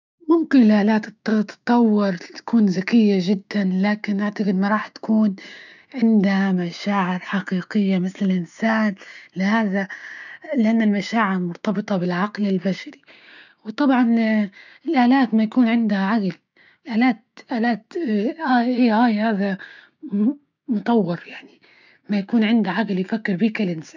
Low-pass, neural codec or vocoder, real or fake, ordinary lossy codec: 7.2 kHz; codec, 16 kHz, 6 kbps, DAC; fake; none